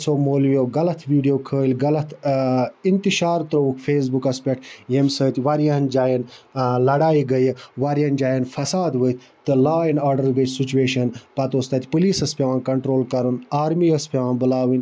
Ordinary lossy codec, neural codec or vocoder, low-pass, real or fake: none; none; none; real